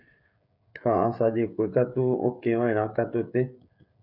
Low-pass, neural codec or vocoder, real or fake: 5.4 kHz; codec, 16 kHz, 16 kbps, FreqCodec, smaller model; fake